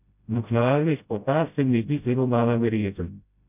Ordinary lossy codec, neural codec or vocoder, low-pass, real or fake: none; codec, 16 kHz, 0.5 kbps, FreqCodec, smaller model; 3.6 kHz; fake